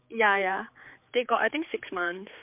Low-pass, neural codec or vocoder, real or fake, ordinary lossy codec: 3.6 kHz; codec, 16 kHz, 4 kbps, X-Codec, HuBERT features, trained on general audio; fake; MP3, 32 kbps